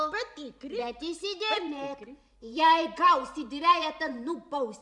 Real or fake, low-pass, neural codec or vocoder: fake; 10.8 kHz; vocoder, 44.1 kHz, 128 mel bands every 512 samples, BigVGAN v2